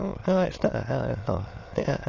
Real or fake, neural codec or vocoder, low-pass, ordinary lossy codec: fake; autoencoder, 22.05 kHz, a latent of 192 numbers a frame, VITS, trained on many speakers; 7.2 kHz; AAC, 32 kbps